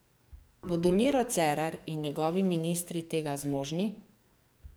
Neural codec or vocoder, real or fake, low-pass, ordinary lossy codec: codec, 44.1 kHz, 2.6 kbps, SNAC; fake; none; none